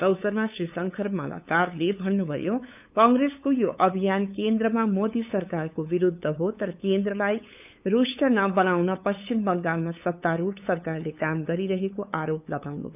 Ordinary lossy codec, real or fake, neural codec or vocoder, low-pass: none; fake; codec, 16 kHz, 8 kbps, FunCodec, trained on LibriTTS, 25 frames a second; 3.6 kHz